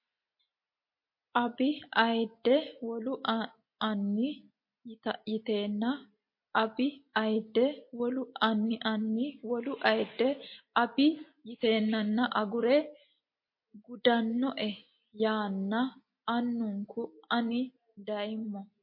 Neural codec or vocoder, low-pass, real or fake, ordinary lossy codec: none; 5.4 kHz; real; MP3, 32 kbps